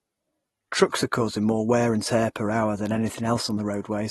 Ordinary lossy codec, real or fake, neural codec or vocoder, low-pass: AAC, 32 kbps; real; none; 19.8 kHz